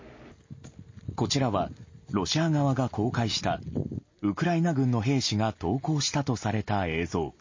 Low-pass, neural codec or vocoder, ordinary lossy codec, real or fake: 7.2 kHz; none; MP3, 32 kbps; real